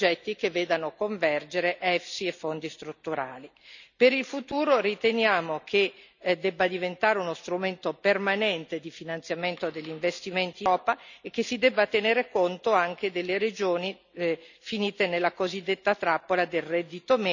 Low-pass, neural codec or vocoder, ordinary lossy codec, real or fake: 7.2 kHz; none; none; real